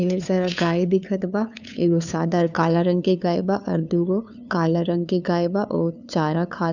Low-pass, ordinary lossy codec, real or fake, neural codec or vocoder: 7.2 kHz; none; fake; codec, 16 kHz, 2 kbps, FunCodec, trained on LibriTTS, 25 frames a second